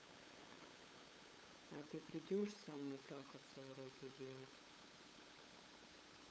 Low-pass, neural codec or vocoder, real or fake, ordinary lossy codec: none; codec, 16 kHz, 8 kbps, FunCodec, trained on LibriTTS, 25 frames a second; fake; none